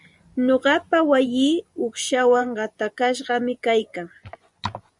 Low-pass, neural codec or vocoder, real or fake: 10.8 kHz; vocoder, 24 kHz, 100 mel bands, Vocos; fake